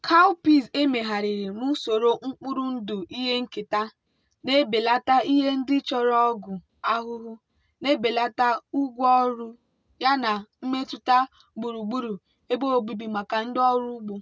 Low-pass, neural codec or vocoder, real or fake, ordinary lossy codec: none; none; real; none